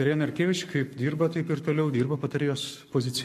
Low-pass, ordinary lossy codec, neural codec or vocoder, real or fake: 14.4 kHz; MP3, 64 kbps; codec, 44.1 kHz, 7.8 kbps, Pupu-Codec; fake